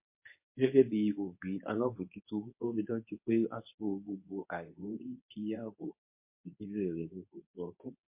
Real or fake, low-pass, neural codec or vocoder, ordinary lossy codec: fake; 3.6 kHz; codec, 24 kHz, 0.9 kbps, WavTokenizer, medium speech release version 2; MP3, 24 kbps